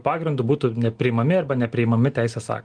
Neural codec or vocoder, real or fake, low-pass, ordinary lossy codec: none; real; 9.9 kHz; Opus, 24 kbps